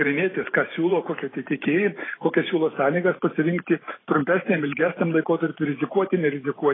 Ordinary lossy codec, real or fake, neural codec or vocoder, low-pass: AAC, 16 kbps; fake; vocoder, 44.1 kHz, 128 mel bands every 512 samples, BigVGAN v2; 7.2 kHz